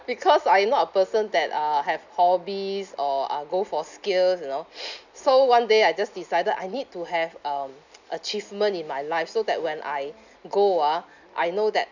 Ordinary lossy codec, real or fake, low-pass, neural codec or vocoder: none; real; 7.2 kHz; none